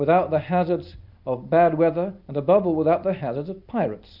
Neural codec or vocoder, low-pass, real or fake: none; 5.4 kHz; real